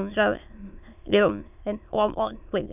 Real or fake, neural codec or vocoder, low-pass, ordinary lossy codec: fake; autoencoder, 22.05 kHz, a latent of 192 numbers a frame, VITS, trained on many speakers; 3.6 kHz; none